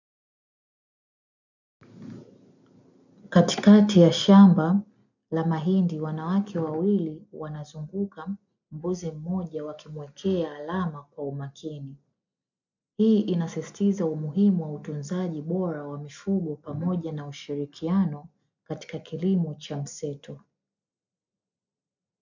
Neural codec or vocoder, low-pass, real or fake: none; 7.2 kHz; real